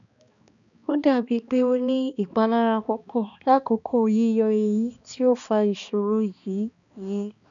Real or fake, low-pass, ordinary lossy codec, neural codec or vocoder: fake; 7.2 kHz; none; codec, 16 kHz, 2 kbps, X-Codec, HuBERT features, trained on balanced general audio